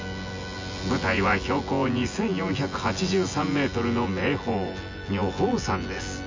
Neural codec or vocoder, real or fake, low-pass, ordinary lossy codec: vocoder, 24 kHz, 100 mel bands, Vocos; fake; 7.2 kHz; MP3, 64 kbps